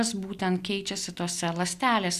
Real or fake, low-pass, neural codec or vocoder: real; 14.4 kHz; none